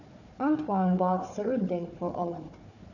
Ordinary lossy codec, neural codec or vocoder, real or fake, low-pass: none; codec, 16 kHz, 4 kbps, FunCodec, trained on Chinese and English, 50 frames a second; fake; 7.2 kHz